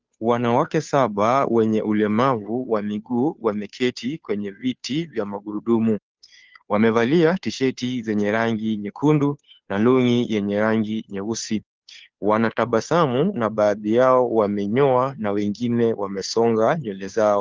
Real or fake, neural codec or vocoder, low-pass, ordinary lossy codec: fake; codec, 16 kHz, 2 kbps, FunCodec, trained on Chinese and English, 25 frames a second; 7.2 kHz; Opus, 24 kbps